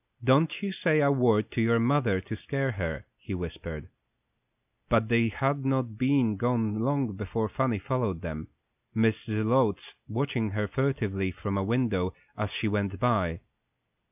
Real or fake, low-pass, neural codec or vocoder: real; 3.6 kHz; none